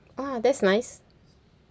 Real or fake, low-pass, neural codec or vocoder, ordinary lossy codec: real; none; none; none